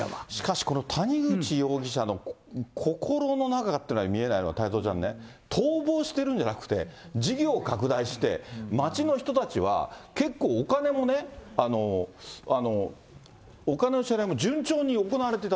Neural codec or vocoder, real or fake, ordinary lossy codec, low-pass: none; real; none; none